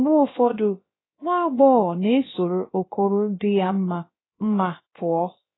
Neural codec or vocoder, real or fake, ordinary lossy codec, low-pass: codec, 16 kHz, about 1 kbps, DyCAST, with the encoder's durations; fake; AAC, 16 kbps; 7.2 kHz